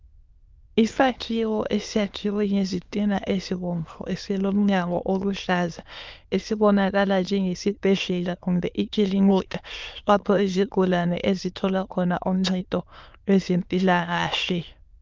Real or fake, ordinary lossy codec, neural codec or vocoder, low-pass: fake; Opus, 24 kbps; autoencoder, 22.05 kHz, a latent of 192 numbers a frame, VITS, trained on many speakers; 7.2 kHz